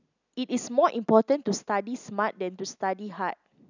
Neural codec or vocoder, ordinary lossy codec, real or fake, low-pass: none; none; real; 7.2 kHz